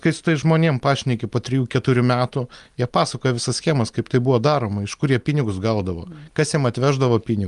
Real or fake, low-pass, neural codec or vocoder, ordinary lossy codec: real; 10.8 kHz; none; Opus, 32 kbps